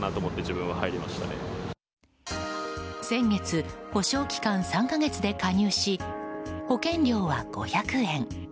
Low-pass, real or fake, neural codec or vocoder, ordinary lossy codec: none; real; none; none